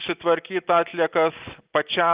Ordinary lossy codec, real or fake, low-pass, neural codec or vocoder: Opus, 32 kbps; real; 3.6 kHz; none